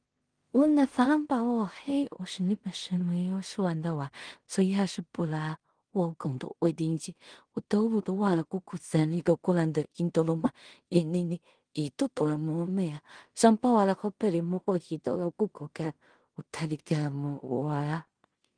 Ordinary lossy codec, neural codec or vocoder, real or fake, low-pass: Opus, 24 kbps; codec, 16 kHz in and 24 kHz out, 0.4 kbps, LongCat-Audio-Codec, two codebook decoder; fake; 9.9 kHz